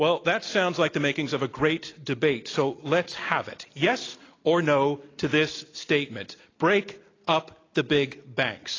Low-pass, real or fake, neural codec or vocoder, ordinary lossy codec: 7.2 kHz; real; none; AAC, 32 kbps